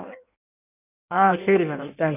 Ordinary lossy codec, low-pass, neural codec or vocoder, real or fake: none; 3.6 kHz; codec, 16 kHz in and 24 kHz out, 1.1 kbps, FireRedTTS-2 codec; fake